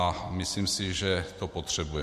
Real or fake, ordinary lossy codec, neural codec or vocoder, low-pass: real; MP3, 64 kbps; none; 14.4 kHz